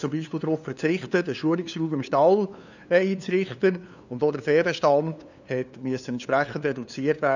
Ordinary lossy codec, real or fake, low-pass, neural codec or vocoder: none; fake; 7.2 kHz; codec, 16 kHz, 2 kbps, FunCodec, trained on LibriTTS, 25 frames a second